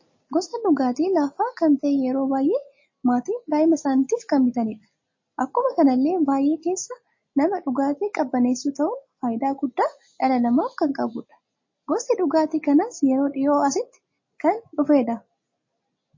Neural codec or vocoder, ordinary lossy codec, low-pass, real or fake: none; MP3, 32 kbps; 7.2 kHz; real